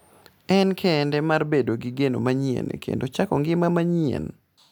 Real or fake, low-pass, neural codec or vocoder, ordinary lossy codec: real; none; none; none